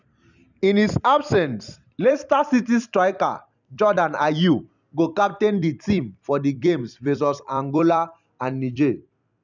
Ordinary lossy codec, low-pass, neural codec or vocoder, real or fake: none; 7.2 kHz; vocoder, 44.1 kHz, 128 mel bands every 256 samples, BigVGAN v2; fake